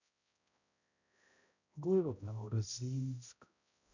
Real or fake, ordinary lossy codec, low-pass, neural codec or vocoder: fake; none; 7.2 kHz; codec, 16 kHz, 0.5 kbps, X-Codec, HuBERT features, trained on balanced general audio